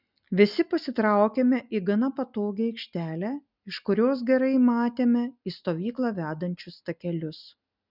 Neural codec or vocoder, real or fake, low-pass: none; real; 5.4 kHz